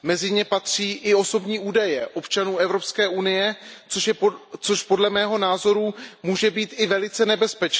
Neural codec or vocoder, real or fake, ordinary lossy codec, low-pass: none; real; none; none